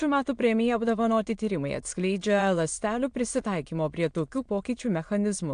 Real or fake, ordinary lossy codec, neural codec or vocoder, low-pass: fake; AAC, 64 kbps; autoencoder, 22.05 kHz, a latent of 192 numbers a frame, VITS, trained on many speakers; 9.9 kHz